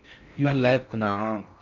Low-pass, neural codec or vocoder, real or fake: 7.2 kHz; codec, 16 kHz in and 24 kHz out, 0.6 kbps, FocalCodec, streaming, 4096 codes; fake